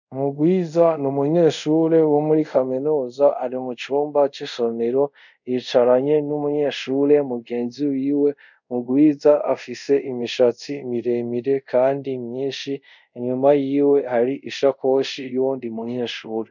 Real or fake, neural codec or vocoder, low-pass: fake; codec, 24 kHz, 0.5 kbps, DualCodec; 7.2 kHz